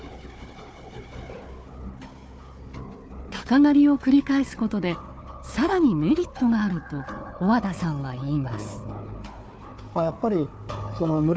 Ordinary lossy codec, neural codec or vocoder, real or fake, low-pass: none; codec, 16 kHz, 4 kbps, FunCodec, trained on Chinese and English, 50 frames a second; fake; none